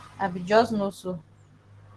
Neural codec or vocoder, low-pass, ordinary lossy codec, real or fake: none; 10.8 kHz; Opus, 16 kbps; real